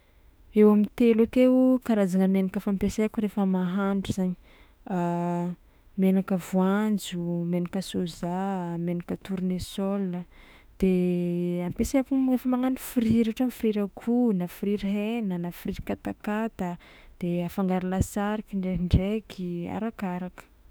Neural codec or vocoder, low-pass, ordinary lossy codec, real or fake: autoencoder, 48 kHz, 32 numbers a frame, DAC-VAE, trained on Japanese speech; none; none; fake